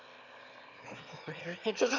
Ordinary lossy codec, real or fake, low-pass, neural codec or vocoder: none; fake; 7.2 kHz; autoencoder, 22.05 kHz, a latent of 192 numbers a frame, VITS, trained on one speaker